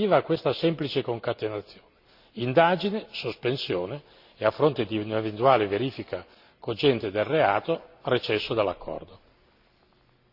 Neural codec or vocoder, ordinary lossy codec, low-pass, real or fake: none; Opus, 64 kbps; 5.4 kHz; real